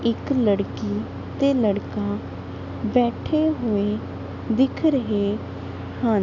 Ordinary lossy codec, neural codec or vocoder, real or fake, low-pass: none; none; real; 7.2 kHz